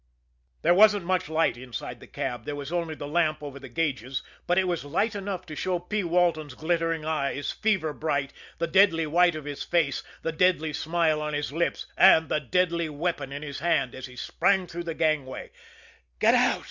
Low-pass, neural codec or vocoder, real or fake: 7.2 kHz; none; real